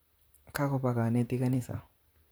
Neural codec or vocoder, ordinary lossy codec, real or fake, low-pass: vocoder, 44.1 kHz, 128 mel bands, Pupu-Vocoder; none; fake; none